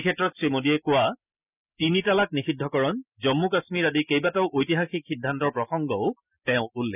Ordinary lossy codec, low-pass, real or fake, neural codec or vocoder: none; 3.6 kHz; real; none